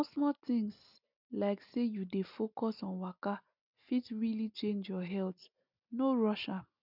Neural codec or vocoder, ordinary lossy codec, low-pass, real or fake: none; none; 5.4 kHz; real